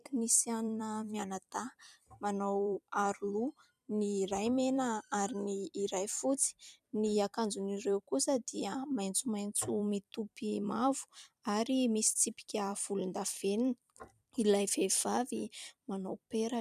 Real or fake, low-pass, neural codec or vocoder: fake; 14.4 kHz; vocoder, 44.1 kHz, 128 mel bands every 256 samples, BigVGAN v2